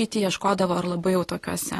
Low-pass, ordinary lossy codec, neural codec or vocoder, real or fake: 19.8 kHz; AAC, 32 kbps; none; real